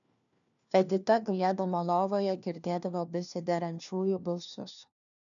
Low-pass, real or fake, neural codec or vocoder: 7.2 kHz; fake; codec, 16 kHz, 1 kbps, FunCodec, trained on LibriTTS, 50 frames a second